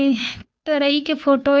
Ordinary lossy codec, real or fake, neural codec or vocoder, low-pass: none; fake; codec, 16 kHz, 2 kbps, FunCodec, trained on Chinese and English, 25 frames a second; none